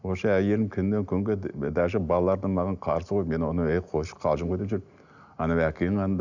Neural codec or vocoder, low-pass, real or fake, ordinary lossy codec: none; 7.2 kHz; real; none